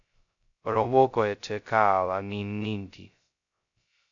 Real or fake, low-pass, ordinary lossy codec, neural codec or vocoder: fake; 7.2 kHz; MP3, 64 kbps; codec, 16 kHz, 0.2 kbps, FocalCodec